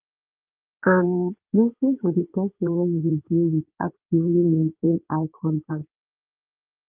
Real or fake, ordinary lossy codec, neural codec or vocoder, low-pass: fake; Opus, 32 kbps; codec, 16 kHz in and 24 kHz out, 2.2 kbps, FireRedTTS-2 codec; 3.6 kHz